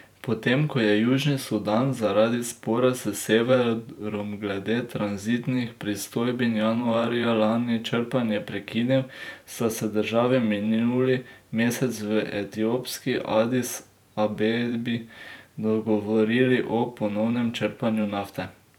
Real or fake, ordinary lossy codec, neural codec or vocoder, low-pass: fake; none; vocoder, 44.1 kHz, 128 mel bands every 512 samples, BigVGAN v2; 19.8 kHz